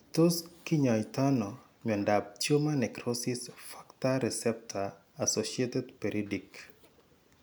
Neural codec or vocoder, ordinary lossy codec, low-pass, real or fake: none; none; none; real